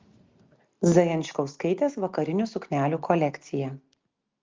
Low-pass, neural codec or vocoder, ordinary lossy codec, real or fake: 7.2 kHz; none; Opus, 32 kbps; real